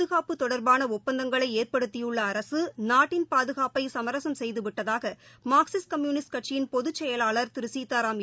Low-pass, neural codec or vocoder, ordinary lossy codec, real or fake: none; none; none; real